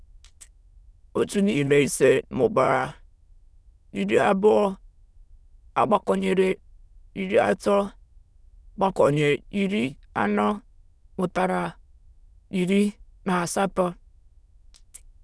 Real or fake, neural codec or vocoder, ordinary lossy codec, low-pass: fake; autoencoder, 22.05 kHz, a latent of 192 numbers a frame, VITS, trained on many speakers; none; none